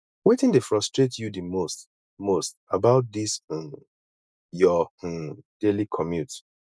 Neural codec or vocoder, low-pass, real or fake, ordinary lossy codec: none; none; real; none